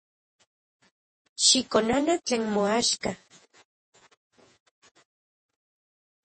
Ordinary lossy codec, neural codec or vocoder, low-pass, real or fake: MP3, 32 kbps; vocoder, 48 kHz, 128 mel bands, Vocos; 10.8 kHz; fake